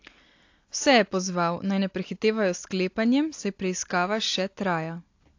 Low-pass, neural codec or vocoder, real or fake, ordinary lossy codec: 7.2 kHz; none; real; AAC, 48 kbps